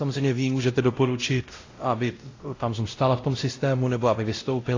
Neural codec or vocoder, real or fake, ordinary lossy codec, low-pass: codec, 16 kHz, 0.5 kbps, X-Codec, WavLM features, trained on Multilingual LibriSpeech; fake; AAC, 32 kbps; 7.2 kHz